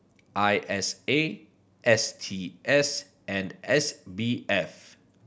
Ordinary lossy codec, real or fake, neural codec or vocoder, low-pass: none; real; none; none